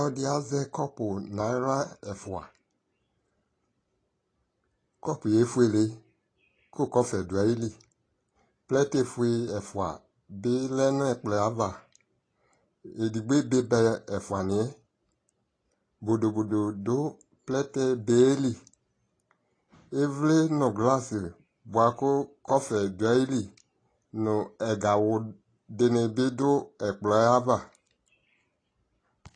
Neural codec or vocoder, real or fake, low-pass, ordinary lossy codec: none; real; 9.9 kHz; AAC, 32 kbps